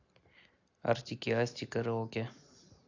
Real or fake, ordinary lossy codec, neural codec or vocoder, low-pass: real; AAC, 48 kbps; none; 7.2 kHz